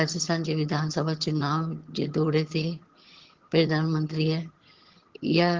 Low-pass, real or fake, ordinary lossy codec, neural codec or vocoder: 7.2 kHz; fake; Opus, 16 kbps; vocoder, 22.05 kHz, 80 mel bands, HiFi-GAN